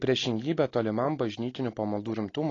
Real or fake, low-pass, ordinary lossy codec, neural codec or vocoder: real; 7.2 kHz; AAC, 32 kbps; none